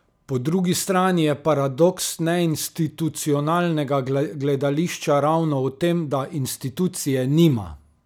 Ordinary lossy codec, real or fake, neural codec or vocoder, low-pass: none; real; none; none